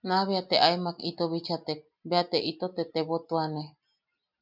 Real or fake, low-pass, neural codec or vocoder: real; 5.4 kHz; none